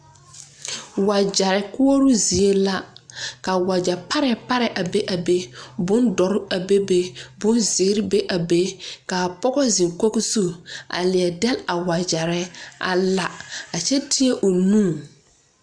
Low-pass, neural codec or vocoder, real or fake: 9.9 kHz; none; real